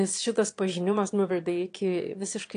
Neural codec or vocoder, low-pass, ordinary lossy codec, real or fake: autoencoder, 22.05 kHz, a latent of 192 numbers a frame, VITS, trained on one speaker; 9.9 kHz; AAC, 48 kbps; fake